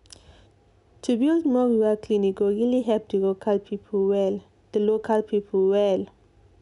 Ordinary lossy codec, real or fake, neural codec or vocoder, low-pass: MP3, 96 kbps; real; none; 10.8 kHz